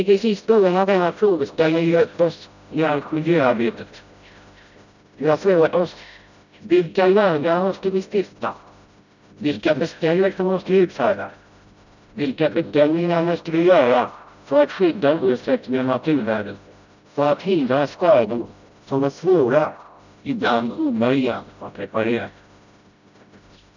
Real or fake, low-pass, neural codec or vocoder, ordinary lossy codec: fake; 7.2 kHz; codec, 16 kHz, 0.5 kbps, FreqCodec, smaller model; none